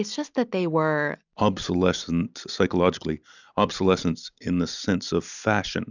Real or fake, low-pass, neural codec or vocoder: real; 7.2 kHz; none